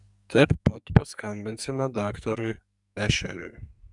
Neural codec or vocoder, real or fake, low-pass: codec, 44.1 kHz, 2.6 kbps, SNAC; fake; 10.8 kHz